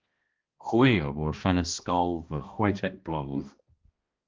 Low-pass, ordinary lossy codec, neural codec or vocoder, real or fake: 7.2 kHz; Opus, 32 kbps; codec, 16 kHz, 1 kbps, X-Codec, HuBERT features, trained on balanced general audio; fake